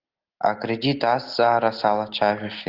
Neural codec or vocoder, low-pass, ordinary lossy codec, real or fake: none; 5.4 kHz; Opus, 16 kbps; real